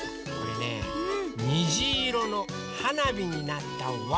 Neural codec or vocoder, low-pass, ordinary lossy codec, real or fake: none; none; none; real